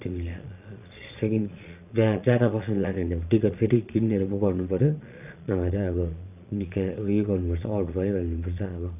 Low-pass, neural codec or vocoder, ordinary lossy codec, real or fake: 3.6 kHz; vocoder, 22.05 kHz, 80 mel bands, Vocos; none; fake